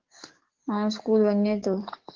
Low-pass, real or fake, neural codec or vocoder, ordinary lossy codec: 7.2 kHz; fake; codec, 44.1 kHz, 7.8 kbps, DAC; Opus, 16 kbps